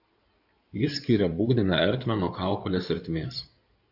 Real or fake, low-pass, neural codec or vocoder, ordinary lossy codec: fake; 5.4 kHz; codec, 16 kHz in and 24 kHz out, 2.2 kbps, FireRedTTS-2 codec; AAC, 48 kbps